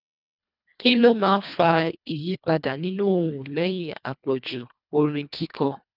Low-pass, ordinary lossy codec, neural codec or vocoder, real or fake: 5.4 kHz; none; codec, 24 kHz, 1.5 kbps, HILCodec; fake